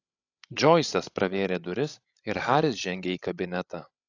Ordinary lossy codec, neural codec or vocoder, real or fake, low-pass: AAC, 48 kbps; codec, 16 kHz, 16 kbps, FreqCodec, larger model; fake; 7.2 kHz